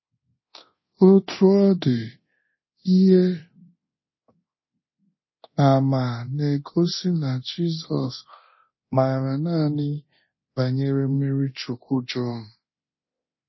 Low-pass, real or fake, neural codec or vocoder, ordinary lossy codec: 7.2 kHz; fake; codec, 24 kHz, 0.9 kbps, DualCodec; MP3, 24 kbps